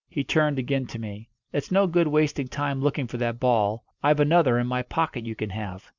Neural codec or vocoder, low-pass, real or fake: none; 7.2 kHz; real